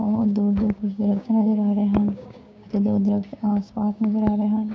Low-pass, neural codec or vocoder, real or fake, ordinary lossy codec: none; codec, 16 kHz, 6 kbps, DAC; fake; none